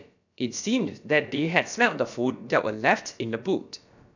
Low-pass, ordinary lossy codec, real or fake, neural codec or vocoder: 7.2 kHz; none; fake; codec, 16 kHz, about 1 kbps, DyCAST, with the encoder's durations